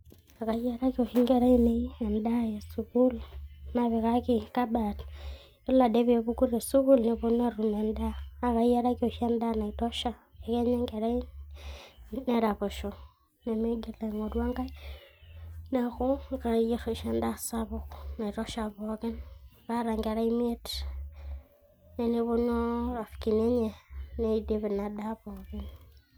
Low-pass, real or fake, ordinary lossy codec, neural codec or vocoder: none; real; none; none